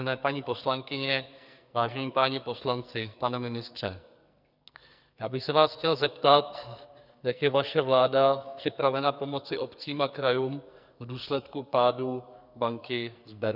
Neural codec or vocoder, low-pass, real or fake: codec, 44.1 kHz, 2.6 kbps, SNAC; 5.4 kHz; fake